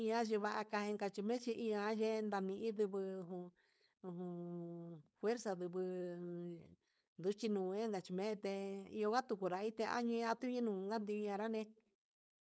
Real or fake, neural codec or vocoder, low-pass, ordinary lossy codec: fake; codec, 16 kHz, 4.8 kbps, FACodec; none; none